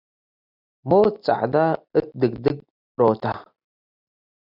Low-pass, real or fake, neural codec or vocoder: 5.4 kHz; real; none